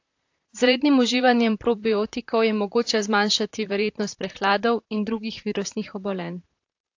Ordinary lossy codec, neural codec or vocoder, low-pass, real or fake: AAC, 48 kbps; vocoder, 44.1 kHz, 128 mel bands, Pupu-Vocoder; 7.2 kHz; fake